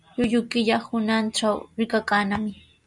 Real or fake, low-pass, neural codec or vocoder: real; 10.8 kHz; none